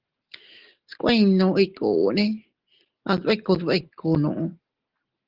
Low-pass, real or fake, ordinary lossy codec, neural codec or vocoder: 5.4 kHz; real; Opus, 16 kbps; none